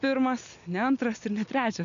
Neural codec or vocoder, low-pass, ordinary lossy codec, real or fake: none; 7.2 kHz; MP3, 96 kbps; real